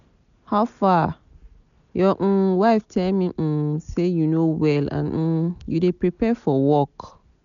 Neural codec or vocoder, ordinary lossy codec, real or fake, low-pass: none; MP3, 96 kbps; real; 7.2 kHz